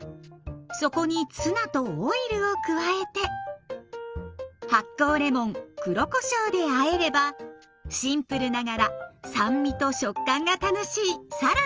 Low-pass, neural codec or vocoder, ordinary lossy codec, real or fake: 7.2 kHz; none; Opus, 24 kbps; real